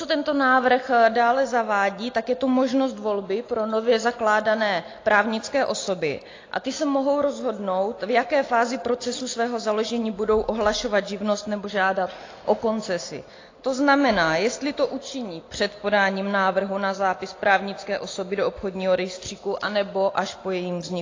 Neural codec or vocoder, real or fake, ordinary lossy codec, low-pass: none; real; AAC, 32 kbps; 7.2 kHz